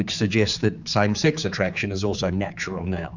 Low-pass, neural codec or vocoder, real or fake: 7.2 kHz; codec, 16 kHz, 4 kbps, X-Codec, HuBERT features, trained on general audio; fake